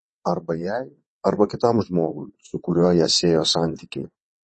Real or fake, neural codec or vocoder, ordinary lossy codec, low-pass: fake; vocoder, 22.05 kHz, 80 mel bands, WaveNeXt; MP3, 32 kbps; 9.9 kHz